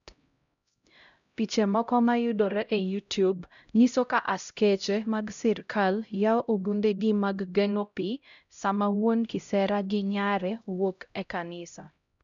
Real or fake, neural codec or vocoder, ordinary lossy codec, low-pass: fake; codec, 16 kHz, 0.5 kbps, X-Codec, HuBERT features, trained on LibriSpeech; none; 7.2 kHz